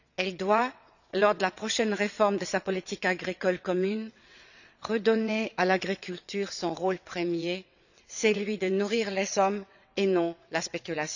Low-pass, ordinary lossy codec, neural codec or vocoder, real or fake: 7.2 kHz; none; vocoder, 22.05 kHz, 80 mel bands, WaveNeXt; fake